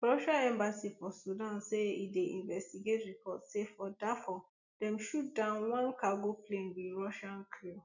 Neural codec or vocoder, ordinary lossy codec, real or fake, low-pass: none; none; real; 7.2 kHz